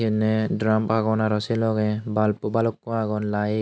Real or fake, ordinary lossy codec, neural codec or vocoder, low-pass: real; none; none; none